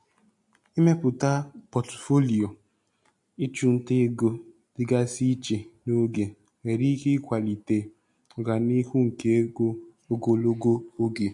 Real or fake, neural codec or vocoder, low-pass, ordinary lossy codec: real; none; 10.8 kHz; MP3, 48 kbps